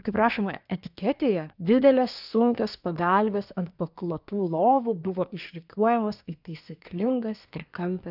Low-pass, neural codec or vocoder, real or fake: 5.4 kHz; codec, 24 kHz, 1 kbps, SNAC; fake